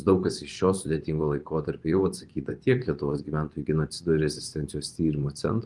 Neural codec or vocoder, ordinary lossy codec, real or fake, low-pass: vocoder, 24 kHz, 100 mel bands, Vocos; Opus, 24 kbps; fake; 10.8 kHz